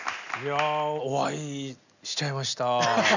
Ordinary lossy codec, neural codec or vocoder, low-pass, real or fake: none; none; 7.2 kHz; real